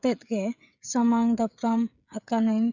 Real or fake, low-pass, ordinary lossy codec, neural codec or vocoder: fake; 7.2 kHz; none; codec, 16 kHz, 16 kbps, FreqCodec, smaller model